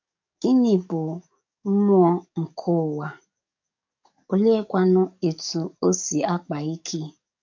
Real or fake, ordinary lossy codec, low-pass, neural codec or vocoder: fake; MP3, 48 kbps; 7.2 kHz; codec, 44.1 kHz, 7.8 kbps, DAC